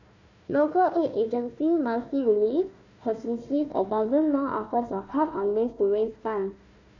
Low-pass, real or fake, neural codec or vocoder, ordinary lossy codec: 7.2 kHz; fake; codec, 16 kHz, 1 kbps, FunCodec, trained on Chinese and English, 50 frames a second; none